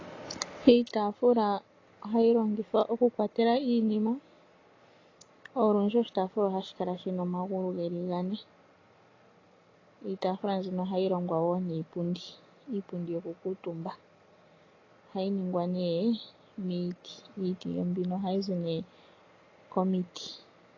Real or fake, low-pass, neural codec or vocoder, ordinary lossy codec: real; 7.2 kHz; none; AAC, 32 kbps